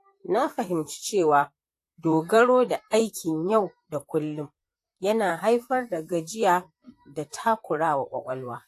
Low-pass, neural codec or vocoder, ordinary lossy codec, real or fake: 14.4 kHz; vocoder, 44.1 kHz, 128 mel bands, Pupu-Vocoder; AAC, 64 kbps; fake